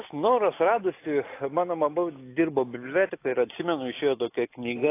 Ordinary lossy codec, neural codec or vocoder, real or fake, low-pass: AAC, 24 kbps; none; real; 3.6 kHz